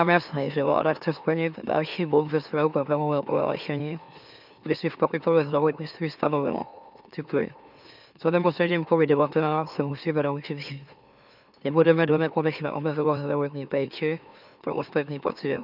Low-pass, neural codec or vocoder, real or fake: 5.4 kHz; autoencoder, 44.1 kHz, a latent of 192 numbers a frame, MeloTTS; fake